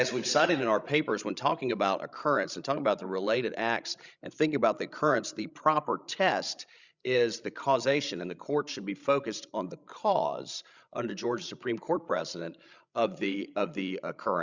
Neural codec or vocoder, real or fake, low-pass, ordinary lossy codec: codec, 16 kHz, 16 kbps, FreqCodec, larger model; fake; 7.2 kHz; Opus, 64 kbps